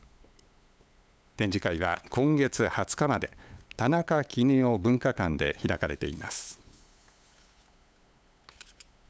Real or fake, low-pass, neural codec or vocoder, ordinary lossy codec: fake; none; codec, 16 kHz, 8 kbps, FunCodec, trained on LibriTTS, 25 frames a second; none